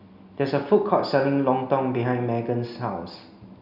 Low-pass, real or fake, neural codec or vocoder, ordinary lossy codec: 5.4 kHz; real; none; none